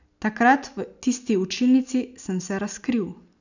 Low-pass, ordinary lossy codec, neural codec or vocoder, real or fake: 7.2 kHz; none; none; real